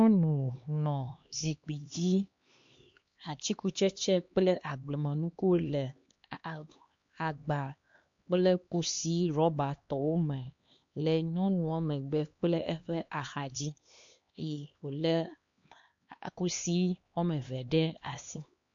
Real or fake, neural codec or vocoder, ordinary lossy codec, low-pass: fake; codec, 16 kHz, 2 kbps, X-Codec, HuBERT features, trained on LibriSpeech; MP3, 48 kbps; 7.2 kHz